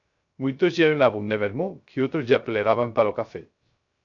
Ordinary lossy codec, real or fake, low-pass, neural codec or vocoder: AAC, 64 kbps; fake; 7.2 kHz; codec, 16 kHz, 0.3 kbps, FocalCodec